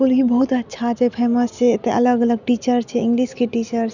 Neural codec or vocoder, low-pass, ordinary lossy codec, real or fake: none; 7.2 kHz; none; real